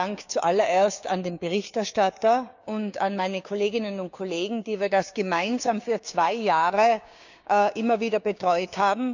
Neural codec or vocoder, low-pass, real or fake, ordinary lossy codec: codec, 16 kHz, 6 kbps, DAC; 7.2 kHz; fake; none